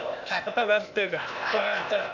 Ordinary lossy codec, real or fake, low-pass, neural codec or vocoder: none; fake; 7.2 kHz; codec, 16 kHz, 0.8 kbps, ZipCodec